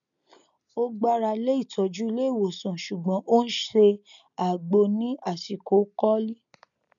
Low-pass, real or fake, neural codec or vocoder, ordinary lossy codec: 7.2 kHz; real; none; none